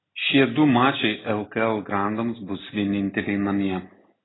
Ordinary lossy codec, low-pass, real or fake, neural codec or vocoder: AAC, 16 kbps; 7.2 kHz; real; none